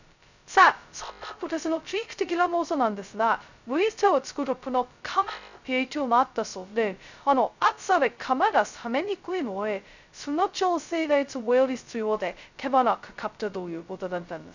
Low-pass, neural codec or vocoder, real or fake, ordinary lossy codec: 7.2 kHz; codec, 16 kHz, 0.2 kbps, FocalCodec; fake; none